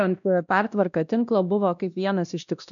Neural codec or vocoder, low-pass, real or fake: codec, 16 kHz, 1 kbps, X-Codec, WavLM features, trained on Multilingual LibriSpeech; 7.2 kHz; fake